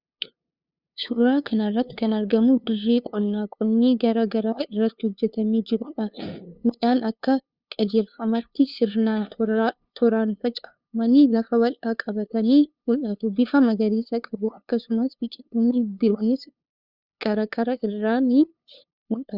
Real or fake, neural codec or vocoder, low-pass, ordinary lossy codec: fake; codec, 16 kHz, 2 kbps, FunCodec, trained on LibriTTS, 25 frames a second; 5.4 kHz; Opus, 64 kbps